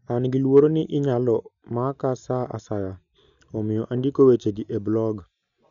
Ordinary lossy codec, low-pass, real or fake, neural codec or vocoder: none; 7.2 kHz; real; none